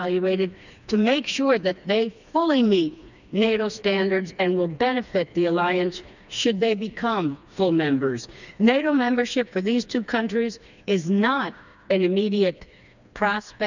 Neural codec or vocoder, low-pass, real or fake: codec, 16 kHz, 2 kbps, FreqCodec, smaller model; 7.2 kHz; fake